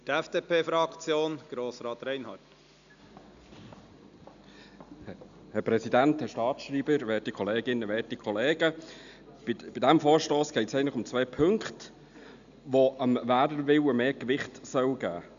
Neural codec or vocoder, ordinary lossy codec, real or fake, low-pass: none; none; real; 7.2 kHz